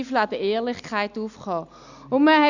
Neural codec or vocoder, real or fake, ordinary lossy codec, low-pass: none; real; none; 7.2 kHz